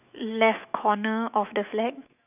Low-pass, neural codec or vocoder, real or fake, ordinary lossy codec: 3.6 kHz; autoencoder, 48 kHz, 128 numbers a frame, DAC-VAE, trained on Japanese speech; fake; none